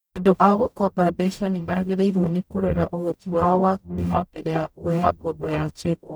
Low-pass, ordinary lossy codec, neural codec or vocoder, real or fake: none; none; codec, 44.1 kHz, 0.9 kbps, DAC; fake